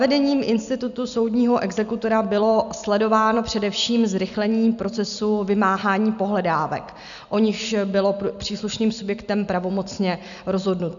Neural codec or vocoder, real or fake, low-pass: none; real; 7.2 kHz